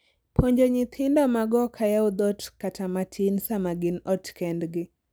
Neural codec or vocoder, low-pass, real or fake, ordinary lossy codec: none; none; real; none